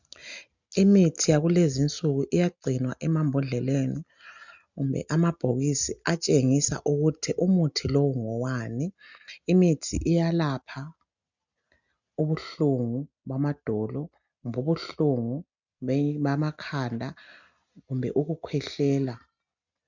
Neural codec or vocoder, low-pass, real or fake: none; 7.2 kHz; real